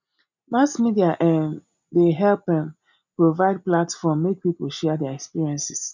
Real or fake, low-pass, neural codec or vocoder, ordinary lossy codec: real; 7.2 kHz; none; none